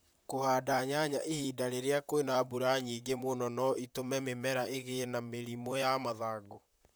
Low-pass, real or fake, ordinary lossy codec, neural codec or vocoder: none; fake; none; vocoder, 44.1 kHz, 128 mel bands, Pupu-Vocoder